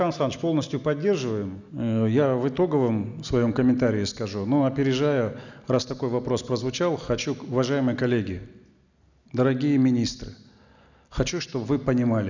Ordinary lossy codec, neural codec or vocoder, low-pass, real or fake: none; none; 7.2 kHz; real